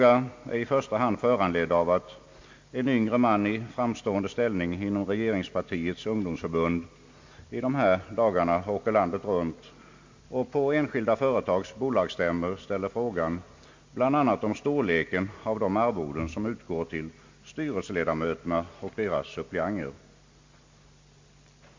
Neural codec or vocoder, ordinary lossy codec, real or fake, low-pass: none; MP3, 48 kbps; real; 7.2 kHz